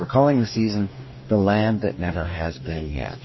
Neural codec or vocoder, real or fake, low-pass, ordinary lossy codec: codec, 44.1 kHz, 2.6 kbps, DAC; fake; 7.2 kHz; MP3, 24 kbps